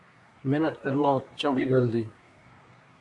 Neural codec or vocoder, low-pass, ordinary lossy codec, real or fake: codec, 24 kHz, 1 kbps, SNAC; 10.8 kHz; AAC, 64 kbps; fake